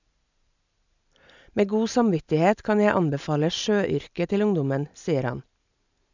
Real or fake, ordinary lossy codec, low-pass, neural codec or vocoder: real; none; 7.2 kHz; none